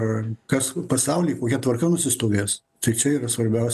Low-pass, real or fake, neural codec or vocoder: 14.4 kHz; real; none